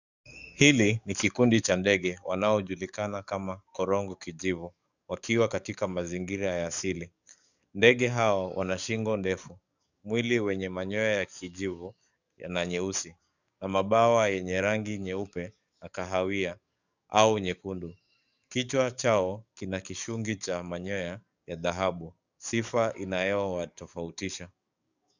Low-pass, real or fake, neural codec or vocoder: 7.2 kHz; fake; codec, 44.1 kHz, 7.8 kbps, DAC